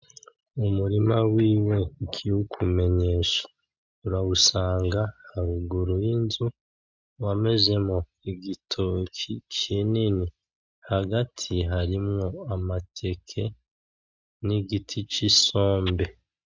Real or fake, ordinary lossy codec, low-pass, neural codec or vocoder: real; MP3, 64 kbps; 7.2 kHz; none